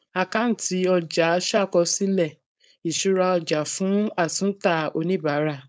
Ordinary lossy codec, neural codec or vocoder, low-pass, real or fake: none; codec, 16 kHz, 4.8 kbps, FACodec; none; fake